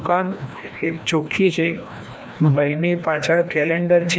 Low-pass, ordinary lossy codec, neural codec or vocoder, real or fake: none; none; codec, 16 kHz, 1 kbps, FreqCodec, larger model; fake